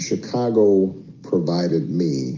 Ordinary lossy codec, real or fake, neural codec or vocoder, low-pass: Opus, 32 kbps; real; none; 7.2 kHz